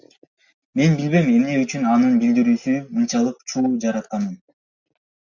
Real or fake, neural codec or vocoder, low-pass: real; none; 7.2 kHz